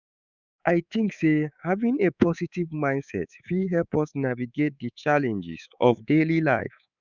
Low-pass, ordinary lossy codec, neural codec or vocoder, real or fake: 7.2 kHz; none; codec, 24 kHz, 3.1 kbps, DualCodec; fake